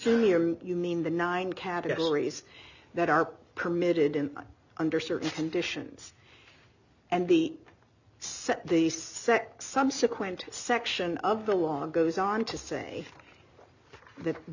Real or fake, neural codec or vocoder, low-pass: real; none; 7.2 kHz